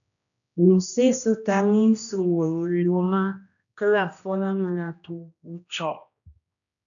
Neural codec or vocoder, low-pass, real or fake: codec, 16 kHz, 1 kbps, X-Codec, HuBERT features, trained on general audio; 7.2 kHz; fake